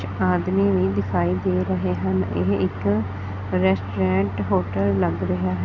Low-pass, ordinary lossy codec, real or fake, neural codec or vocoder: 7.2 kHz; none; real; none